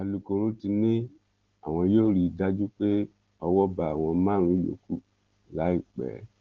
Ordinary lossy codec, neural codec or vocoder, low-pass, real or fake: Opus, 16 kbps; none; 7.2 kHz; real